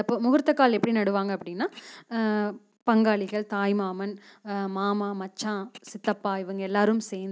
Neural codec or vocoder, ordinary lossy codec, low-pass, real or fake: none; none; none; real